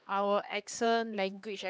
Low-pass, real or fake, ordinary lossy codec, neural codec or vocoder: none; fake; none; codec, 16 kHz, 2 kbps, X-Codec, HuBERT features, trained on LibriSpeech